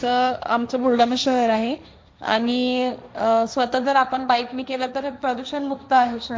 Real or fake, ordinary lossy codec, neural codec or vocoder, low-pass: fake; none; codec, 16 kHz, 1.1 kbps, Voila-Tokenizer; none